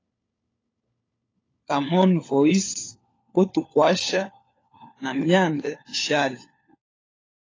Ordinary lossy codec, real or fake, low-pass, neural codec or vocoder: AAC, 32 kbps; fake; 7.2 kHz; codec, 16 kHz, 4 kbps, FunCodec, trained on LibriTTS, 50 frames a second